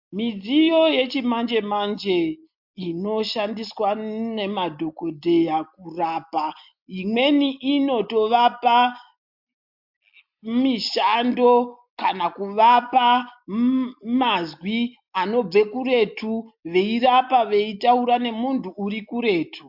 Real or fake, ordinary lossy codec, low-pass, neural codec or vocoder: real; MP3, 48 kbps; 5.4 kHz; none